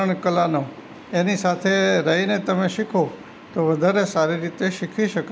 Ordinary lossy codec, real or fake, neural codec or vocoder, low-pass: none; real; none; none